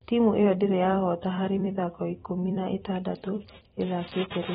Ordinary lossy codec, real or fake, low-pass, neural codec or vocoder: AAC, 16 kbps; fake; 19.8 kHz; vocoder, 44.1 kHz, 128 mel bands every 256 samples, BigVGAN v2